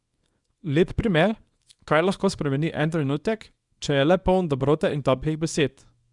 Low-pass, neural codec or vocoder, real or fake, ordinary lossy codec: 10.8 kHz; codec, 24 kHz, 0.9 kbps, WavTokenizer, small release; fake; Opus, 64 kbps